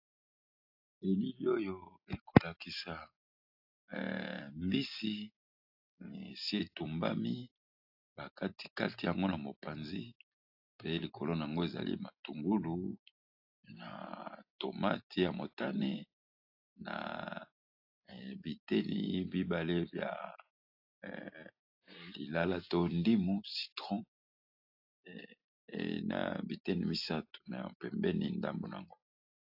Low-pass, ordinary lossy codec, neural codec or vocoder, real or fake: 5.4 kHz; AAC, 48 kbps; none; real